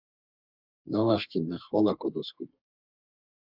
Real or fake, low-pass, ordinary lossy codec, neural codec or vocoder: fake; 5.4 kHz; Opus, 64 kbps; codec, 32 kHz, 1.9 kbps, SNAC